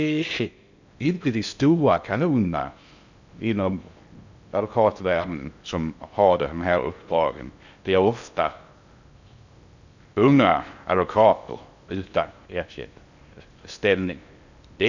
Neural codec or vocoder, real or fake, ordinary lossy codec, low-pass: codec, 16 kHz in and 24 kHz out, 0.6 kbps, FocalCodec, streaming, 2048 codes; fake; none; 7.2 kHz